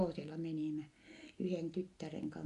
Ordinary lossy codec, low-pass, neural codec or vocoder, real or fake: MP3, 96 kbps; 10.8 kHz; none; real